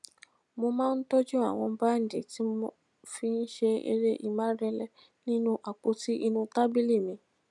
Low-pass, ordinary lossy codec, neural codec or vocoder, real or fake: none; none; none; real